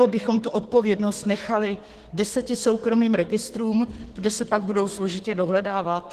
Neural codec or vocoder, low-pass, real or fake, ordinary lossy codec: codec, 32 kHz, 1.9 kbps, SNAC; 14.4 kHz; fake; Opus, 16 kbps